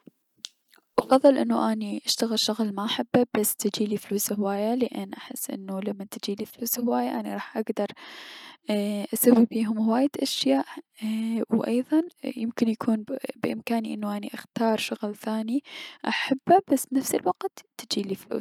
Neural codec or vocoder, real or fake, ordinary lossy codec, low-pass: none; real; none; 19.8 kHz